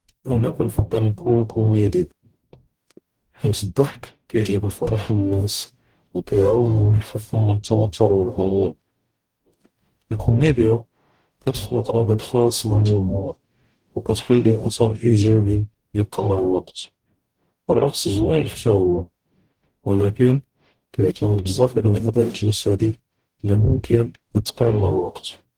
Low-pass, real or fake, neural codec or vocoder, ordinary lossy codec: 19.8 kHz; fake; codec, 44.1 kHz, 0.9 kbps, DAC; Opus, 24 kbps